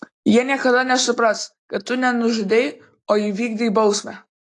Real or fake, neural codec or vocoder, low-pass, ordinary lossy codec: real; none; 10.8 kHz; AAC, 48 kbps